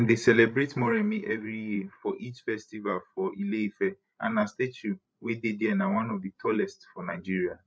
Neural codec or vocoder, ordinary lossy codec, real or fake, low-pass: codec, 16 kHz, 16 kbps, FreqCodec, larger model; none; fake; none